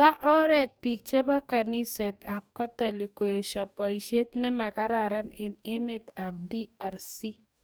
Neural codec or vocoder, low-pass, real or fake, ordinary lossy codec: codec, 44.1 kHz, 2.6 kbps, DAC; none; fake; none